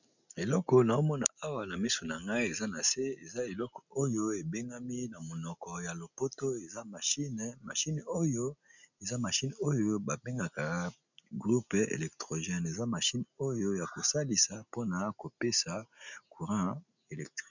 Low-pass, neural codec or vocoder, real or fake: 7.2 kHz; none; real